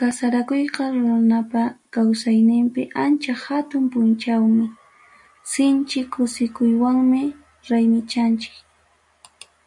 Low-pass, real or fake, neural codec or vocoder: 10.8 kHz; real; none